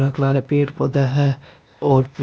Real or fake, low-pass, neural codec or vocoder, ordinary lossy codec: fake; none; codec, 16 kHz, 0.8 kbps, ZipCodec; none